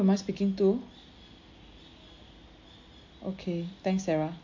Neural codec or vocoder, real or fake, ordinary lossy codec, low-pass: none; real; MP3, 48 kbps; 7.2 kHz